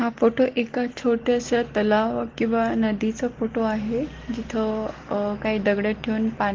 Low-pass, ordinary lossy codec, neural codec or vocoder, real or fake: 7.2 kHz; Opus, 16 kbps; none; real